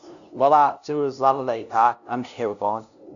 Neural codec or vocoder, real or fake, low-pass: codec, 16 kHz, 0.5 kbps, FunCodec, trained on LibriTTS, 25 frames a second; fake; 7.2 kHz